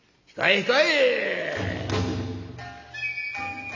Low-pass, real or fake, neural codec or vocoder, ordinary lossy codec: 7.2 kHz; real; none; MP3, 64 kbps